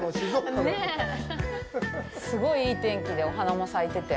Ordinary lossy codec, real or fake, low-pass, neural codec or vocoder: none; real; none; none